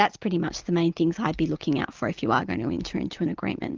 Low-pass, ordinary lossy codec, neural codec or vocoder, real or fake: 7.2 kHz; Opus, 24 kbps; none; real